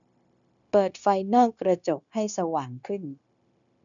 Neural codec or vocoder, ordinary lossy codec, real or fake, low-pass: codec, 16 kHz, 0.9 kbps, LongCat-Audio-Codec; none; fake; 7.2 kHz